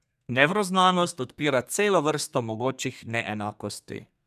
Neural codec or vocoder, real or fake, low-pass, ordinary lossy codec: codec, 32 kHz, 1.9 kbps, SNAC; fake; 14.4 kHz; none